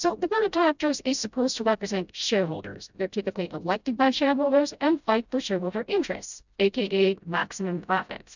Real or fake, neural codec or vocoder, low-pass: fake; codec, 16 kHz, 0.5 kbps, FreqCodec, smaller model; 7.2 kHz